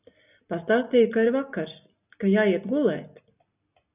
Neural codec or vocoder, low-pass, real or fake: none; 3.6 kHz; real